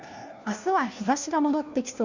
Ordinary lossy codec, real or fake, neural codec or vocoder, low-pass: Opus, 64 kbps; fake; codec, 16 kHz, 1 kbps, FunCodec, trained on LibriTTS, 50 frames a second; 7.2 kHz